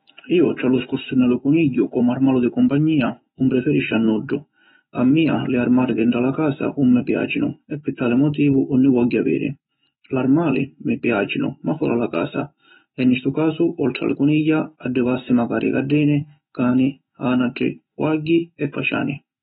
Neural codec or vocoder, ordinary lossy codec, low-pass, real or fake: autoencoder, 48 kHz, 128 numbers a frame, DAC-VAE, trained on Japanese speech; AAC, 16 kbps; 19.8 kHz; fake